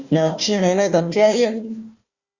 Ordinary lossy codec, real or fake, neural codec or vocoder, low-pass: Opus, 64 kbps; fake; codec, 16 kHz, 1 kbps, FunCodec, trained on Chinese and English, 50 frames a second; 7.2 kHz